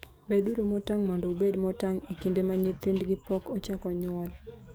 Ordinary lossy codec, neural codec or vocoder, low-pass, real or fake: none; codec, 44.1 kHz, 7.8 kbps, DAC; none; fake